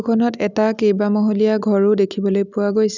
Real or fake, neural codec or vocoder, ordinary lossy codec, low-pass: real; none; none; 7.2 kHz